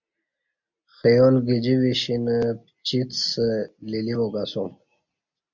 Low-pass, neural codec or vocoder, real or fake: 7.2 kHz; none; real